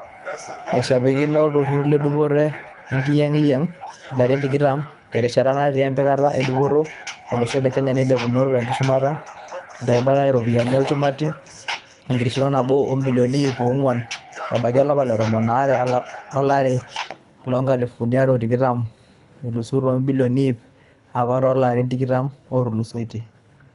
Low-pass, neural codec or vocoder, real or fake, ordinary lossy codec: 10.8 kHz; codec, 24 kHz, 3 kbps, HILCodec; fake; none